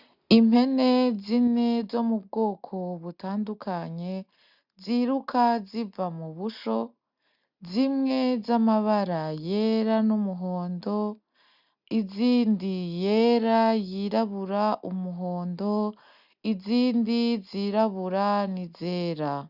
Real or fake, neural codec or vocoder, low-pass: real; none; 5.4 kHz